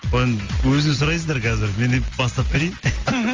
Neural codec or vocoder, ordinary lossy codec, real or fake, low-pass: none; Opus, 32 kbps; real; 7.2 kHz